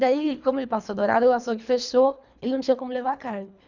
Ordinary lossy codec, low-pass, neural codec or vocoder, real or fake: none; 7.2 kHz; codec, 24 kHz, 3 kbps, HILCodec; fake